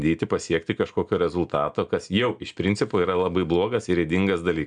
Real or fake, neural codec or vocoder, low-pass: real; none; 9.9 kHz